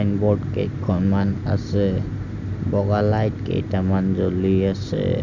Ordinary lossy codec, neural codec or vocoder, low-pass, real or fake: none; none; 7.2 kHz; real